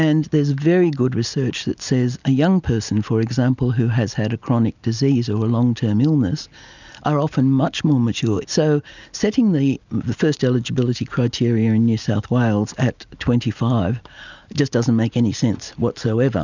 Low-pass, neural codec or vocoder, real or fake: 7.2 kHz; none; real